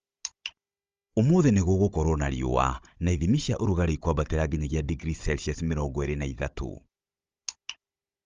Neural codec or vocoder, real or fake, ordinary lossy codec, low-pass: codec, 16 kHz, 16 kbps, FunCodec, trained on Chinese and English, 50 frames a second; fake; Opus, 32 kbps; 7.2 kHz